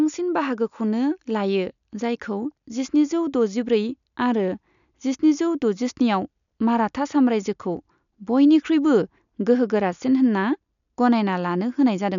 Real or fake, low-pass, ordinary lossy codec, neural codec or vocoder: real; 7.2 kHz; MP3, 96 kbps; none